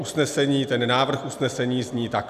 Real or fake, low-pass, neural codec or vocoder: real; 14.4 kHz; none